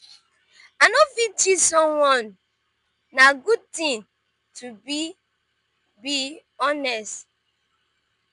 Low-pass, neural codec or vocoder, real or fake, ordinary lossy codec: 10.8 kHz; none; real; none